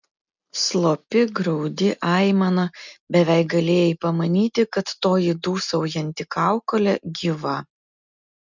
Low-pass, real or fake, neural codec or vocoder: 7.2 kHz; real; none